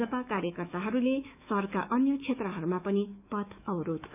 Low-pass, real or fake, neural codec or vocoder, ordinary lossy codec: 3.6 kHz; fake; autoencoder, 48 kHz, 128 numbers a frame, DAC-VAE, trained on Japanese speech; none